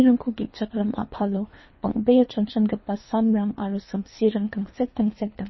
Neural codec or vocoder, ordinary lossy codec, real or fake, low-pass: codec, 24 kHz, 3 kbps, HILCodec; MP3, 24 kbps; fake; 7.2 kHz